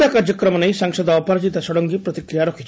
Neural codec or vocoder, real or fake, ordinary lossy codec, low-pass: none; real; none; none